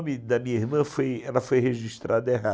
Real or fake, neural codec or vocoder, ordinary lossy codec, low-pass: real; none; none; none